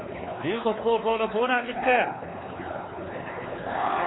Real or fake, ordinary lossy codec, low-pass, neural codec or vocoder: fake; AAC, 16 kbps; 7.2 kHz; codec, 16 kHz, 4 kbps, X-Codec, WavLM features, trained on Multilingual LibriSpeech